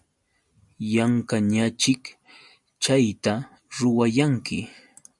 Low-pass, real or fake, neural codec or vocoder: 10.8 kHz; real; none